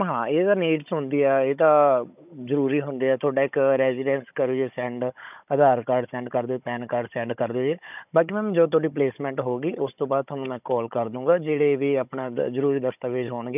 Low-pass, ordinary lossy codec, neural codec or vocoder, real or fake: 3.6 kHz; none; codec, 16 kHz, 16 kbps, FunCodec, trained on Chinese and English, 50 frames a second; fake